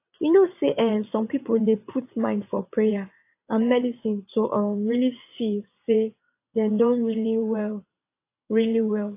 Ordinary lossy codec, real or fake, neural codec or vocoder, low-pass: AAC, 24 kbps; fake; vocoder, 22.05 kHz, 80 mel bands, WaveNeXt; 3.6 kHz